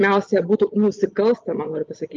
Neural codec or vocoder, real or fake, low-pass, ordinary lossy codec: none; real; 7.2 kHz; Opus, 24 kbps